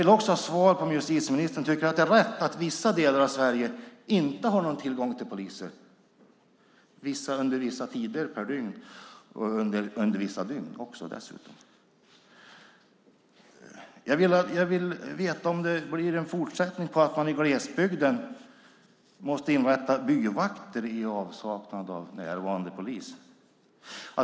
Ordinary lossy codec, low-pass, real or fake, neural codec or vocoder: none; none; real; none